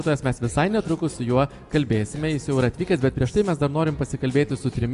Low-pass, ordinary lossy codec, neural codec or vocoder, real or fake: 10.8 kHz; Opus, 64 kbps; none; real